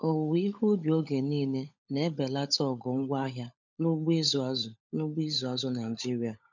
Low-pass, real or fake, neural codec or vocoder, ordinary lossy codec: 7.2 kHz; fake; codec, 16 kHz, 16 kbps, FunCodec, trained on LibriTTS, 50 frames a second; none